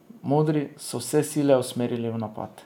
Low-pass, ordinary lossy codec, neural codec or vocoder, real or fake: 19.8 kHz; none; none; real